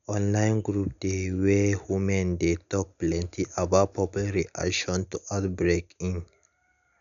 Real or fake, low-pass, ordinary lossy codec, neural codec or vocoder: real; 7.2 kHz; none; none